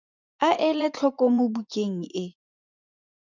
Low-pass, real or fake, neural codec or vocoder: 7.2 kHz; fake; vocoder, 24 kHz, 100 mel bands, Vocos